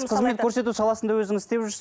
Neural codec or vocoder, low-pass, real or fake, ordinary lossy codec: none; none; real; none